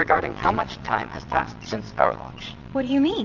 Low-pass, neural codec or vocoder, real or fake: 7.2 kHz; vocoder, 22.05 kHz, 80 mel bands, Vocos; fake